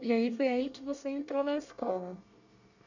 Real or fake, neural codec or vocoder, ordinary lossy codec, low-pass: fake; codec, 24 kHz, 1 kbps, SNAC; none; 7.2 kHz